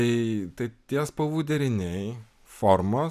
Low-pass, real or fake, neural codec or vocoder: 14.4 kHz; real; none